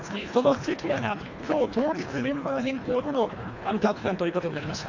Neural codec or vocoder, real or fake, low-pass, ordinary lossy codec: codec, 24 kHz, 1.5 kbps, HILCodec; fake; 7.2 kHz; AAC, 48 kbps